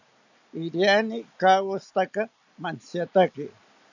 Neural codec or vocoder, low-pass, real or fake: none; 7.2 kHz; real